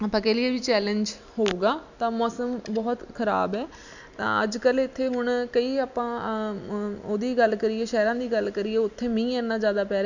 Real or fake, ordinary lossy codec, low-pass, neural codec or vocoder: real; none; 7.2 kHz; none